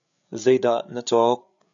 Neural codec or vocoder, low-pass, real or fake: codec, 16 kHz, 8 kbps, FreqCodec, larger model; 7.2 kHz; fake